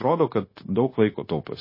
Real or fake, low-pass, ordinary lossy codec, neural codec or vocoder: fake; 5.4 kHz; MP3, 24 kbps; codec, 16 kHz, 0.9 kbps, LongCat-Audio-Codec